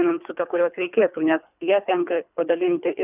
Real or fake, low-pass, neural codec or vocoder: fake; 3.6 kHz; codec, 24 kHz, 3 kbps, HILCodec